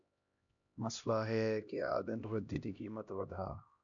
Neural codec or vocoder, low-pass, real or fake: codec, 16 kHz, 1 kbps, X-Codec, HuBERT features, trained on LibriSpeech; 7.2 kHz; fake